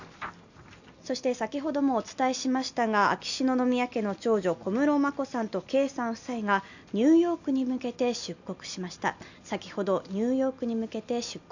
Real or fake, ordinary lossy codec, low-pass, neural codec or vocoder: real; none; 7.2 kHz; none